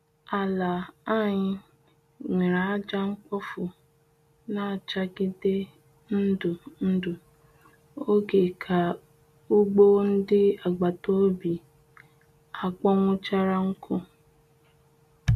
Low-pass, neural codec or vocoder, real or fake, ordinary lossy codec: 14.4 kHz; none; real; MP3, 64 kbps